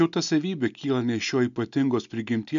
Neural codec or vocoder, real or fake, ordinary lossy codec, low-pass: none; real; MP3, 64 kbps; 7.2 kHz